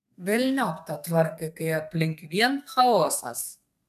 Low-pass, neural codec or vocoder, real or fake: 14.4 kHz; codec, 32 kHz, 1.9 kbps, SNAC; fake